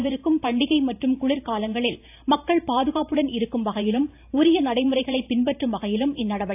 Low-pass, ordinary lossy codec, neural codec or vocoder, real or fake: 3.6 kHz; AAC, 32 kbps; vocoder, 44.1 kHz, 128 mel bands every 512 samples, BigVGAN v2; fake